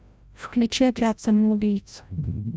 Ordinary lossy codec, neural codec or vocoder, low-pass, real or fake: none; codec, 16 kHz, 0.5 kbps, FreqCodec, larger model; none; fake